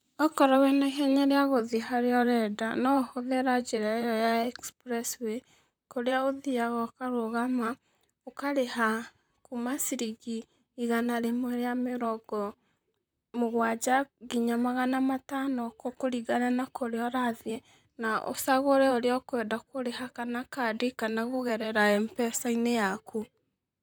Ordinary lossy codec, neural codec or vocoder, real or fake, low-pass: none; vocoder, 44.1 kHz, 128 mel bands, Pupu-Vocoder; fake; none